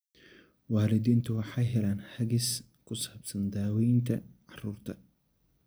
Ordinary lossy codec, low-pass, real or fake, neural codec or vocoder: none; none; real; none